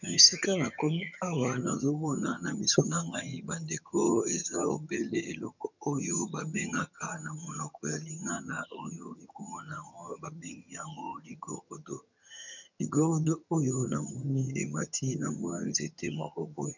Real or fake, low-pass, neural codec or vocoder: fake; 7.2 kHz; vocoder, 22.05 kHz, 80 mel bands, HiFi-GAN